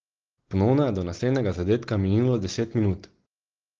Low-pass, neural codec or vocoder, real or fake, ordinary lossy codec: 7.2 kHz; none; real; Opus, 16 kbps